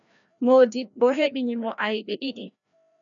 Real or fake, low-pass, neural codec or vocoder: fake; 7.2 kHz; codec, 16 kHz, 1 kbps, FreqCodec, larger model